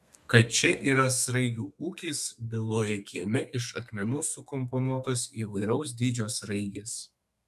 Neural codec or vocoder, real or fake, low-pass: codec, 32 kHz, 1.9 kbps, SNAC; fake; 14.4 kHz